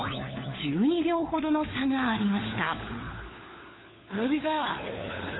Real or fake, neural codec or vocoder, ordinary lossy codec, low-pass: fake; codec, 16 kHz, 4 kbps, FunCodec, trained on LibriTTS, 50 frames a second; AAC, 16 kbps; 7.2 kHz